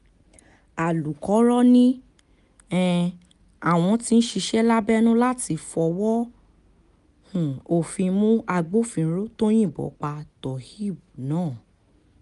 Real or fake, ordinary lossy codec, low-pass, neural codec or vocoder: real; none; 10.8 kHz; none